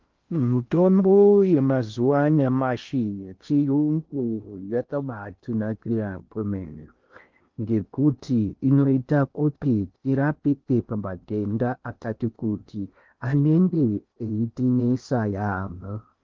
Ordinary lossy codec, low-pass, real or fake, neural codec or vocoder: Opus, 32 kbps; 7.2 kHz; fake; codec, 16 kHz in and 24 kHz out, 0.6 kbps, FocalCodec, streaming, 4096 codes